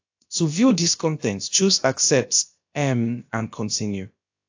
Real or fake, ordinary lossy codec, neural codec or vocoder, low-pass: fake; AAC, 48 kbps; codec, 16 kHz, about 1 kbps, DyCAST, with the encoder's durations; 7.2 kHz